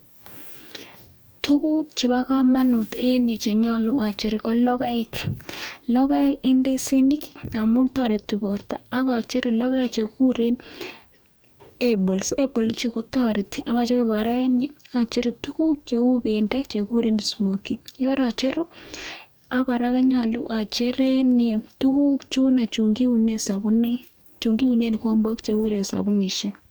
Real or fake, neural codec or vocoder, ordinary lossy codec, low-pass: fake; codec, 44.1 kHz, 2.6 kbps, DAC; none; none